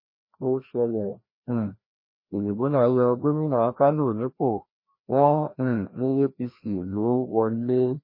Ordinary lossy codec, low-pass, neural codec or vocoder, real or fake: MP3, 24 kbps; 5.4 kHz; codec, 16 kHz, 1 kbps, FreqCodec, larger model; fake